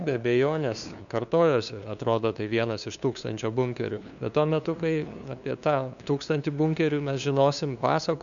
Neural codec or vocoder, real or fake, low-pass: codec, 16 kHz, 2 kbps, FunCodec, trained on LibriTTS, 25 frames a second; fake; 7.2 kHz